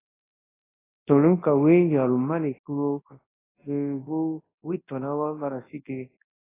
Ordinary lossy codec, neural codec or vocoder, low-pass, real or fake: AAC, 16 kbps; codec, 24 kHz, 0.9 kbps, WavTokenizer, large speech release; 3.6 kHz; fake